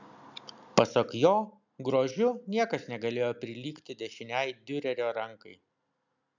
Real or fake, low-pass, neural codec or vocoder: real; 7.2 kHz; none